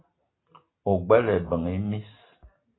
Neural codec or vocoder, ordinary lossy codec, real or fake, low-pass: none; AAC, 16 kbps; real; 7.2 kHz